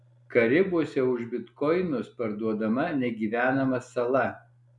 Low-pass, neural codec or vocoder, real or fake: 10.8 kHz; none; real